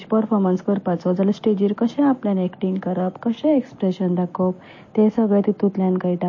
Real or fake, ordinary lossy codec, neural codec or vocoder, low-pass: real; MP3, 32 kbps; none; 7.2 kHz